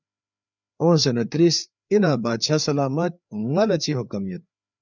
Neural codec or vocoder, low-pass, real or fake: codec, 16 kHz, 4 kbps, FreqCodec, larger model; 7.2 kHz; fake